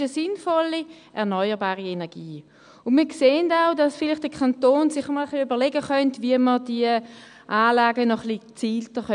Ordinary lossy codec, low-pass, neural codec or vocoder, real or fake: none; 9.9 kHz; none; real